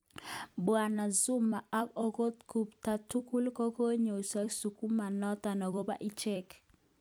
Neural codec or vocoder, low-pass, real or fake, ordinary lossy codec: vocoder, 44.1 kHz, 128 mel bands every 256 samples, BigVGAN v2; none; fake; none